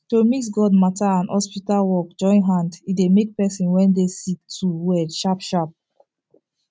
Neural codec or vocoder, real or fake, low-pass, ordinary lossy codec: none; real; none; none